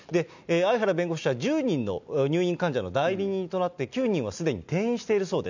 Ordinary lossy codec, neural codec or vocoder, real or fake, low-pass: none; none; real; 7.2 kHz